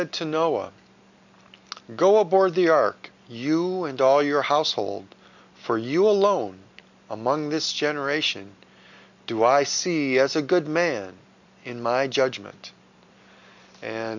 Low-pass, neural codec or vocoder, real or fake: 7.2 kHz; none; real